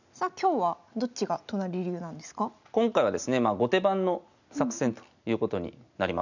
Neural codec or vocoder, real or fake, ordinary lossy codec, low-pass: none; real; none; 7.2 kHz